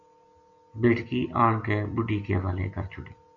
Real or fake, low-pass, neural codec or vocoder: real; 7.2 kHz; none